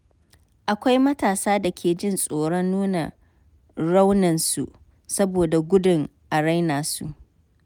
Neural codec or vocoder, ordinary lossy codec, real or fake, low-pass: none; none; real; none